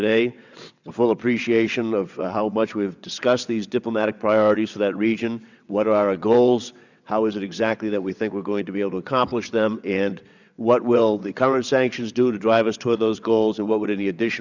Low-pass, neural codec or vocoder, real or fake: 7.2 kHz; vocoder, 22.05 kHz, 80 mel bands, WaveNeXt; fake